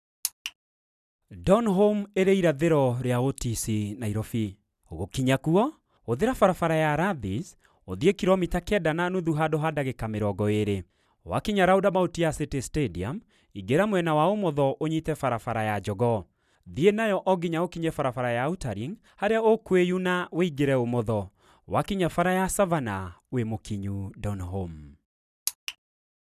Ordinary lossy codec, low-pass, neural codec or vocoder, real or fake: none; 14.4 kHz; none; real